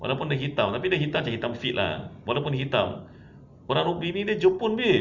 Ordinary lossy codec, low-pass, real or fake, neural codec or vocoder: none; 7.2 kHz; real; none